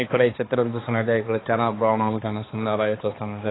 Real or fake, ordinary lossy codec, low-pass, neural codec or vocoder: fake; AAC, 16 kbps; 7.2 kHz; codec, 16 kHz, 2 kbps, X-Codec, HuBERT features, trained on balanced general audio